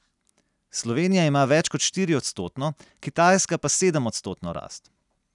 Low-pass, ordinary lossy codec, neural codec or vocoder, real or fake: 10.8 kHz; none; none; real